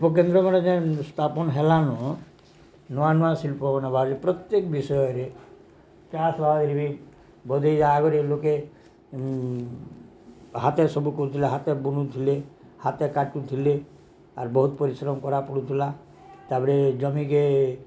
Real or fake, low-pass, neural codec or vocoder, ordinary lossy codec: real; none; none; none